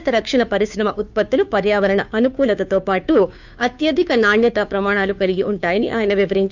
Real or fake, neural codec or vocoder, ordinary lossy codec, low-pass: fake; codec, 16 kHz, 2 kbps, FunCodec, trained on Chinese and English, 25 frames a second; none; 7.2 kHz